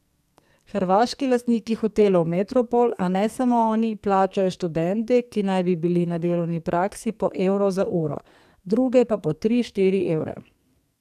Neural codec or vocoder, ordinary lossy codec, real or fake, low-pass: codec, 44.1 kHz, 2.6 kbps, SNAC; none; fake; 14.4 kHz